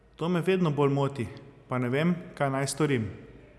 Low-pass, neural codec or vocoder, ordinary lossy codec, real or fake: none; none; none; real